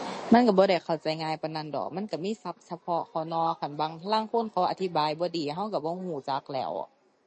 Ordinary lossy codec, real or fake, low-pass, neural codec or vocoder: MP3, 32 kbps; real; 10.8 kHz; none